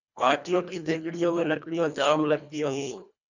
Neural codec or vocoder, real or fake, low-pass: codec, 24 kHz, 1.5 kbps, HILCodec; fake; 7.2 kHz